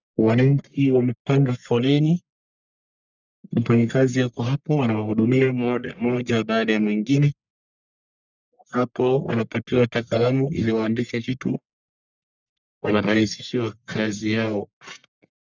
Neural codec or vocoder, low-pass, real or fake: codec, 44.1 kHz, 1.7 kbps, Pupu-Codec; 7.2 kHz; fake